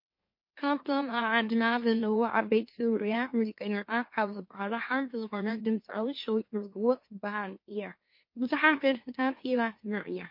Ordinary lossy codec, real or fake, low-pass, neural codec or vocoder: MP3, 32 kbps; fake; 5.4 kHz; autoencoder, 44.1 kHz, a latent of 192 numbers a frame, MeloTTS